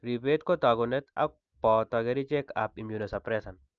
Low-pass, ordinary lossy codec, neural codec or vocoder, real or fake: 7.2 kHz; Opus, 24 kbps; none; real